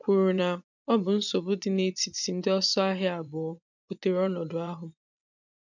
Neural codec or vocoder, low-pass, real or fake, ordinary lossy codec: vocoder, 24 kHz, 100 mel bands, Vocos; 7.2 kHz; fake; none